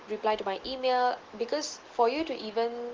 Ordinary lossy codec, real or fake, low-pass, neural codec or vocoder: Opus, 16 kbps; real; 7.2 kHz; none